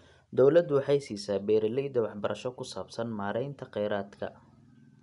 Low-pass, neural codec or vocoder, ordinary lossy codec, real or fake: 10.8 kHz; none; none; real